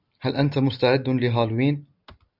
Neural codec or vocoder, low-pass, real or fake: none; 5.4 kHz; real